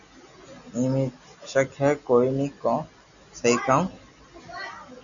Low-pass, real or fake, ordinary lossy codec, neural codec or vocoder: 7.2 kHz; real; MP3, 96 kbps; none